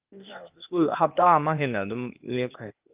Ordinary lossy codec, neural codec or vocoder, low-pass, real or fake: Opus, 24 kbps; codec, 16 kHz, 0.8 kbps, ZipCodec; 3.6 kHz; fake